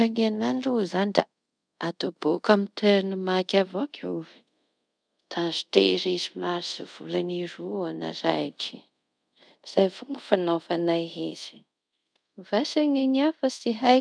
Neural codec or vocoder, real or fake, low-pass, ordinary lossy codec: codec, 24 kHz, 0.5 kbps, DualCodec; fake; 9.9 kHz; none